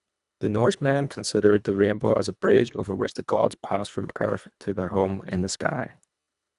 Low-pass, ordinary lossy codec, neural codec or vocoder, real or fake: 10.8 kHz; none; codec, 24 kHz, 1.5 kbps, HILCodec; fake